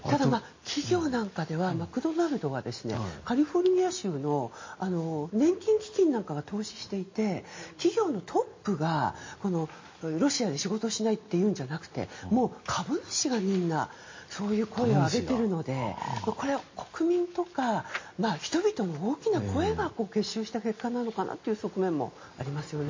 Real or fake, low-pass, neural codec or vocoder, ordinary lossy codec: real; 7.2 kHz; none; MP3, 32 kbps